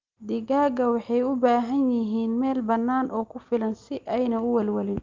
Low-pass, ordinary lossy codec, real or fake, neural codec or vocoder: 7.2 kHz; Opus, 24 kbps; real; none